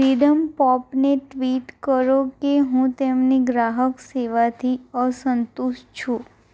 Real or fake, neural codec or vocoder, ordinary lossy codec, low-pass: real; none; none; none